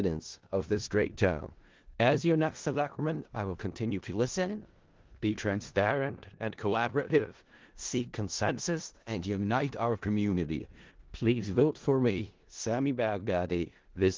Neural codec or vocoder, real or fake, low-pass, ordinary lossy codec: codec, 16 kHz in and 24 kHz out, 0.4 kbps, LongCat-Audio-Codec, four codebook decoder; fake; 7.2 kHz; Opus, 16 kbps